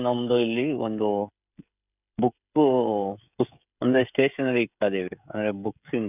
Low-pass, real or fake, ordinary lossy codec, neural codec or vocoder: 3.6 kHz; fake; none; codec, 16 kHz, 16 kbps, FreqCodec, smaller model